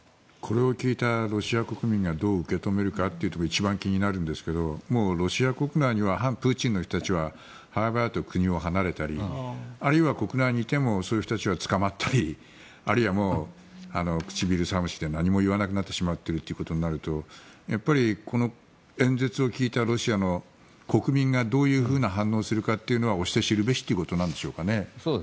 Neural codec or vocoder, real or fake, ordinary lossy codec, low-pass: none; real; none; none